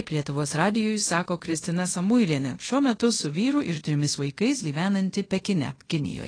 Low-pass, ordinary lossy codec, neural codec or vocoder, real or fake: 9.9 kHz; AAC, 32 kbps; codec, 24 kHz, 0.5 kbps, DualCodec; fake